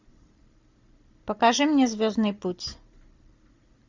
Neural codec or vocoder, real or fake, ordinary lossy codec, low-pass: none; real; MP3, 64 kbps; 7.2 kHz